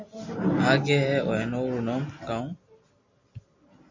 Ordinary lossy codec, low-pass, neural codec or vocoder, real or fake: MP3, 48 kbps; 7.2 kHz; none; real